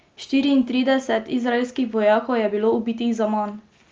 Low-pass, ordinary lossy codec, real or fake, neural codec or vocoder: 7.2 kHz; Opus, 24 kbps; real; none